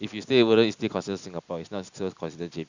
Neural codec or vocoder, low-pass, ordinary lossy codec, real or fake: none; 7.2 kHz; Opus, 64 kbps; real